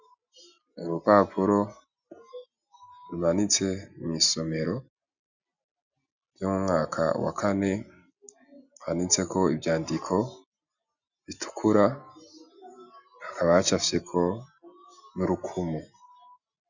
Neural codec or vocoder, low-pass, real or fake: none; 7.2 kHz; real